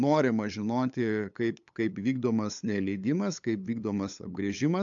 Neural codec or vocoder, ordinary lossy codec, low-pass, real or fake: codec, 16 kHz, 8 kbps, FunCodec, trained on LibriTTS, 25 frames a second; AAC, 64 kbps; 7.2 kHz; fake